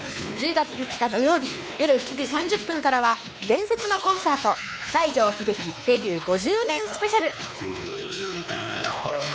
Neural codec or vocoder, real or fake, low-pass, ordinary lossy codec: codec, 16 kHz, 2 kbps, X-Codec, WavLM features, trained on Multilingual LibriSpeech; fake; none; none